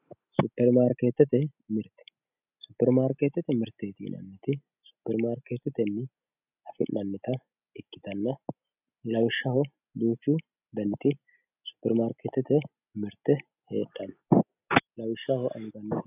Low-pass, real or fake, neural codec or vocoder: 3.6 kHz; real; none